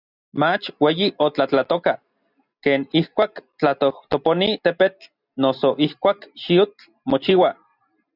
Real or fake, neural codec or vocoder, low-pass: real; none; 5.4 kHz